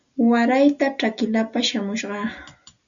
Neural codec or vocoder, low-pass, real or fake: none; 7.2 kHz; real